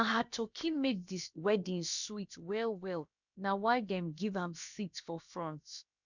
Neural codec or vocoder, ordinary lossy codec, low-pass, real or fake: codec, 16 kHz, about 1 kbps, DyCAST, with the encoder's durations; none; 7.2 kHz; fake